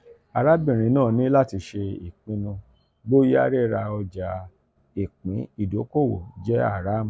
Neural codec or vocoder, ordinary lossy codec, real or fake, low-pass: none; none; real; none